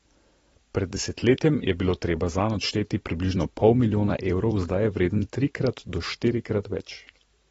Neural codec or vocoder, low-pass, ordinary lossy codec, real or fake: vocoder, 44.1 kHz, 128 mel bands every 256 samples, BigVGAN v2; 19.8 kHz; AAC, 24 kbps; fake